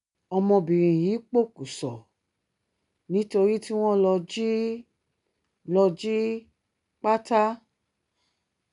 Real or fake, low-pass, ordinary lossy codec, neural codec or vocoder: real; 10.8 kHz; none; none